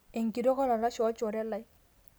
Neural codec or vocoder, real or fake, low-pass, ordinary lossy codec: none; real; none; none